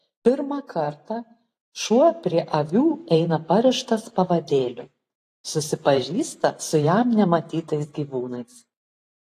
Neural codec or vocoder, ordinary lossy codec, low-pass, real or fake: vocoder, 44.1 kHz, 128 mel bands every 512 samples, BigVGAN v2; AAC, 48 kbps; 14.4 kHz; fake